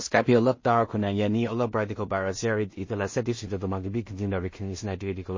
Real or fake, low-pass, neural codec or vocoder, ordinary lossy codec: fake; 7.2 kHz; codec, 16 kHz in and 24 kHz out, 0.4 kbps, LongCat-Audio-Codec, two codebook decoder; MP3, 32 kbps